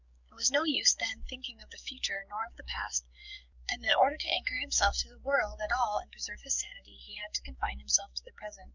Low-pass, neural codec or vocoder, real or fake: 7.2 kHz; codec, 44.1 kHz, 7.8 kbps, DAC; fake